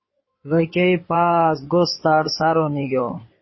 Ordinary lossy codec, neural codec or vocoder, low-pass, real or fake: MP3, 24 kbps; codec, 16 kHz in and 24 kHz out, 2.2 kbps, FireRedTTS-2 codec; 7.2 kHz; fake